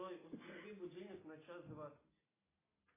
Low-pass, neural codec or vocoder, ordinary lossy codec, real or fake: 3.6 kHz; none; AAC, 16 kbps; real